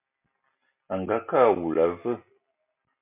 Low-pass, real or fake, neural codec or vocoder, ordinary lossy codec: 3.6 kHz; real; none; MP3, 32 kbps